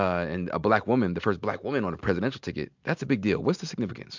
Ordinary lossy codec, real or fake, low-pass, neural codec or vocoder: MP3, 64 kbps; real; 7.2 kHz; none